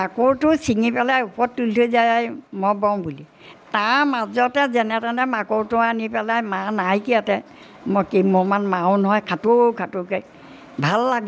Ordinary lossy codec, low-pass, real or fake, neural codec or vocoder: none; none; real; none